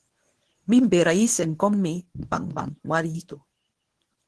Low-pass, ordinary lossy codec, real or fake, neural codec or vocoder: 10.8 kHz; Opus, 16 kbps; fake; codec, 24 kHz, 0.9 kbps, WavTokenizer, medium speech release version 1